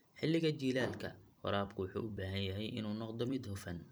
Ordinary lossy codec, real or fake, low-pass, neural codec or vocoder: none; fake; none; vocoder, 44.1 kHz, 128 mel bands every 256 samples, BigVGAN v2